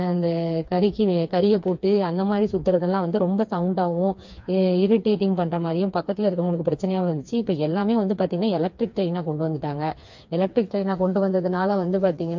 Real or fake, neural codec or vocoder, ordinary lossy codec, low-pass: fake; codec, 16 kHz, 4 kbps, FreqCodec, smaller model; MP3, 48 kbps; 7.2 kHz